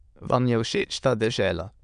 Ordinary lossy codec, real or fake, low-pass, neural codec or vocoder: none; fake; 9.9 kHz; autoencoder, 22.05 kHz, a latent of 192 numbers a frame, VITS, trained on many speakers